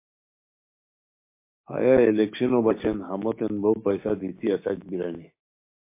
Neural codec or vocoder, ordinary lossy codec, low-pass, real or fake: codec, 16 kHz, 6 kbps, DAC; MP3, 24 kbps; 3.6 kHz; fake